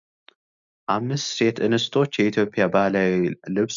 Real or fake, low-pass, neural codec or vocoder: real; 7.2 kHz; none